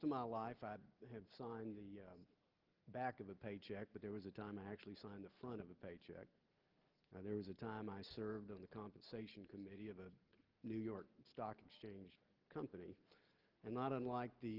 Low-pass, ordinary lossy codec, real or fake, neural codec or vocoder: 5.4 kHz; Opus, 16 kbps; real; none